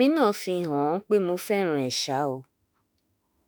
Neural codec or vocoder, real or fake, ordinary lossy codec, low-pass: autoencoder, 48 kHz, 32 numbers a frame, DAC-VAE, trained on Japanese speech; fake; none; none